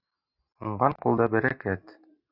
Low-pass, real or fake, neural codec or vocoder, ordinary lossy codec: 5.4 kHz; real; none; Opus, 64 kbps